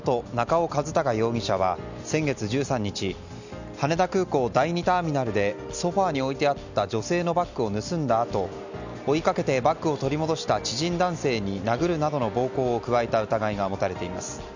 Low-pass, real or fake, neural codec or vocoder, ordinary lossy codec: 7.2 kHz; real; none; none